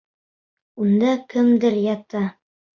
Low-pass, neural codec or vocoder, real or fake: 7.2 kHz; none; real